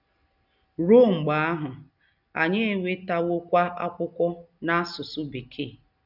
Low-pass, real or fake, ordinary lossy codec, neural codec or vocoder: 5.4 kHz; real; none; none